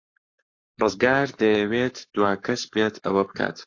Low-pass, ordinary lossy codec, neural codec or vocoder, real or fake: 7.2 kHz; AAC, 48 kbps; codec, 44.1 kHz, 7.8 kbps, Pupu-Codec; fake